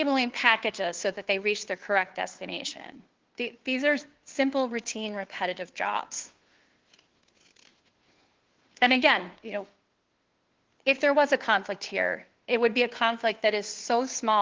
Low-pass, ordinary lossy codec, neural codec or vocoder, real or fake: 7.2 kHz; Opus, 16 kbps; codec, 16 kHz, 2 kbps, FunCodec, trained on Chinese and English, 25 frames a second; fake